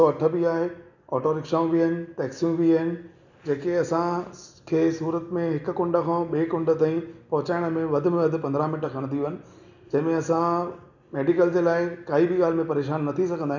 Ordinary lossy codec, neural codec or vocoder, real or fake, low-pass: none; none; real; 7.2 kHz